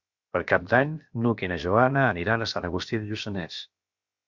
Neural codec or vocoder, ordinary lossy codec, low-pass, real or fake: codec, 16 kHz, about 1 kbps, DyCAST, with the encoder's durations; Opus, 64 kbps; 7.2 kHz; fake